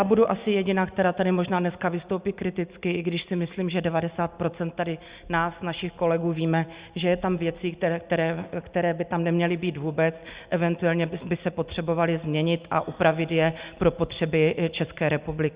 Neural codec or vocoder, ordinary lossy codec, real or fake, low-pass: none; Opus, 64 kbps; real; 3.6 kHz